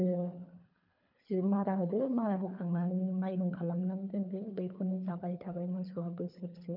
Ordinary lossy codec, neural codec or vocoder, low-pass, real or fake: none; codec, 24 kHz, 3 kbps, HILCodec; 5.4 kHz; fake